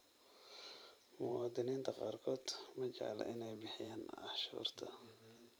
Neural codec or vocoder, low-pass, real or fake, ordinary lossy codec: vocoder, 44.1 kHz, 128 mel bands every 512 samples, BigVGAN v2; none; fake; none